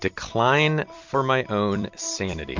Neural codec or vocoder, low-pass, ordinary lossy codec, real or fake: vocoder, 44.1 kHz, 128 mel bands every 256 samples, BigVGAN v2; 7.2 kHz; MP3, 48 kbps; fake